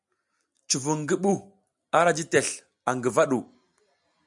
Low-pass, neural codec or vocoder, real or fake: 10.8 kHz; none; real